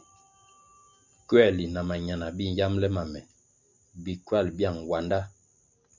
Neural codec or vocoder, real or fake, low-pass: none; real; 7.2 kHz